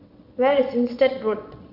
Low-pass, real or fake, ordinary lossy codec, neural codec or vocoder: 5.4 kHz; fake; none; vocoder, 22.05 kHz, 80 mel bands, WaveNeXt